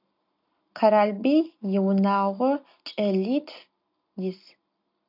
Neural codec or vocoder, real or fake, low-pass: none; real; 5.4 kHz